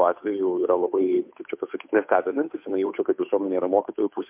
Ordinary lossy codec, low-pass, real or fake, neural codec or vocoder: MP3, 32 kbps; 3.6 kHz; fake; codec, 16 kHz, 2 kbps, FunCodec, trained on Chinese and English, 25 frames a second